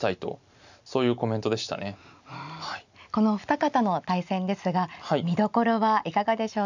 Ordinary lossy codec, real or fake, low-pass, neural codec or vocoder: none; real; 7.2 kHz; none